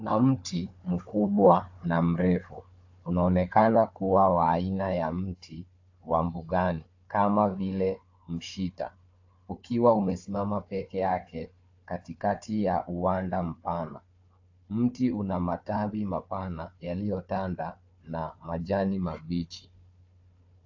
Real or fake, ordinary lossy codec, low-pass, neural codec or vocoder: fake; AAC, 48 kbps; 7.2 kHz; codec, 16 kHz, 4 kbps, FunCodec, trained on Chinese and English, 50 frames a second